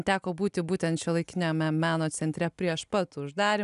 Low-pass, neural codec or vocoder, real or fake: 10.8 kHz; none; real